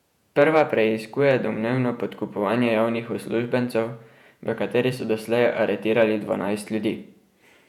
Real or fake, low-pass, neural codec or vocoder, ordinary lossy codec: fake; 19.8 kHz; vocoder, 48 kHz, 128 mel bands, Vocos; none